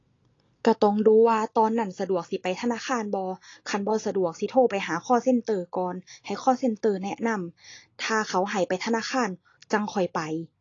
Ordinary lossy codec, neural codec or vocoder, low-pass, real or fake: AAC, 32 kbps; none; 7.2 kHz; real